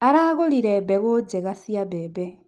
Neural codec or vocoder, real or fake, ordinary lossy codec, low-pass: none; real; Opus, 24 kbps; 10.8 kHz